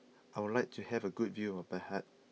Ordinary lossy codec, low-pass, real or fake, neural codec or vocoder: none; none; real; none